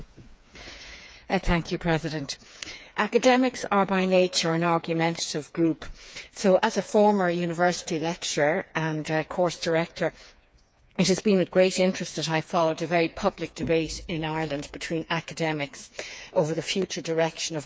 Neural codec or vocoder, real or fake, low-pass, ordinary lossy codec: codec, 16 kHz, 4 kbps, FreqCodec, smaller model; fake; none; none